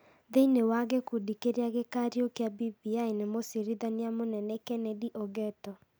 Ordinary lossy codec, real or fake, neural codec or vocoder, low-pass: none; real; none; none